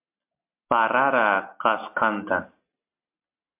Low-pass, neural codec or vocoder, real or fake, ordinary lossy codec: 3.6 kHz; none; real; MP3, 32 kbps